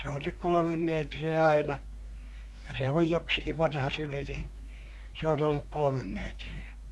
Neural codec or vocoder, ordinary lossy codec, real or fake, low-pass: codec, 24 kHz, 1 kbps, SNAC; none; fake; none